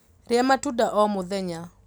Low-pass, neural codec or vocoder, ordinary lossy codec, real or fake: none; none; none; real